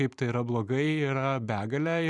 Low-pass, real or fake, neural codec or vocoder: 10.8 kHz; fake; vocoder, 44.1 kHz, 128 mel bands every 256 samples, BigVGAN v2